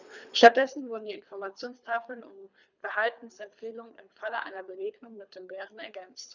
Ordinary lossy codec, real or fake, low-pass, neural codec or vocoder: none; fake; 7.2 kHz; codec, 24 kHz, 3 kbps, HILCodec